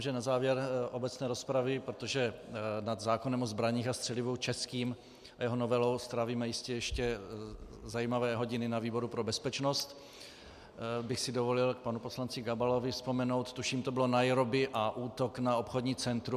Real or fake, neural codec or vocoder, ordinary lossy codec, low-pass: real; none; MP3, 96 kbps; 14.4 kHz